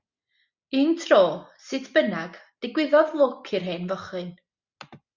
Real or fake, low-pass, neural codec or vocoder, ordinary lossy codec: real; 7.2 kHz; none; Opus, 64 kbps